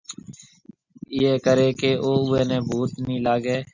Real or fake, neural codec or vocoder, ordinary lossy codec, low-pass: real; none; Opus, 64 kbps; 7.2 kHz